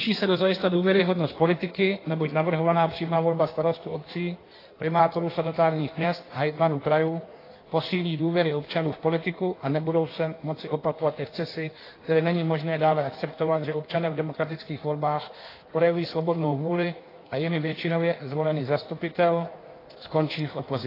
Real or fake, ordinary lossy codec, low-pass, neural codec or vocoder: fake; AAC, 24 kbps; 5.4 kHz; codec, 16 kHz in and 24 kHz out, 1.1 kbps, FireRedTTS-2 codec